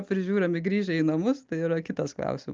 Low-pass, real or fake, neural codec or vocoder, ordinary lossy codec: 7.2 kHz; real; none; Opus, 24 kbps